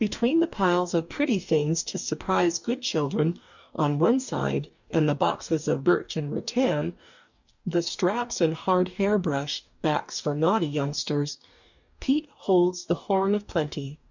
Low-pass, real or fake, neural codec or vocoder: 7.2 kHz; fake; codec, 44.1 kHz, 2.6 kbps, DAC